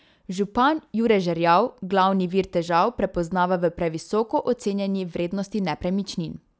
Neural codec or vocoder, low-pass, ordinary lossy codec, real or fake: none; none; none; real